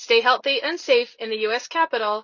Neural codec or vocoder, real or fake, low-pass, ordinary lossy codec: none; real; 7.2 kHz; Opus, 64 kbps